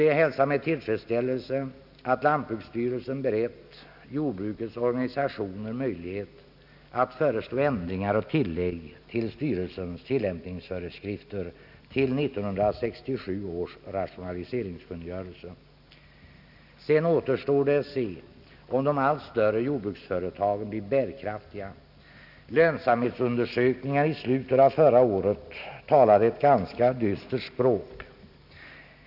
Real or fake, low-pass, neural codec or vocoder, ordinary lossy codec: real; 5.4 kHz; none; none